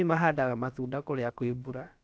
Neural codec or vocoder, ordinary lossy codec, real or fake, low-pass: codec, 16 kHz, about 1 kbps, DyCAST, with the encoder's durations; none; fake; none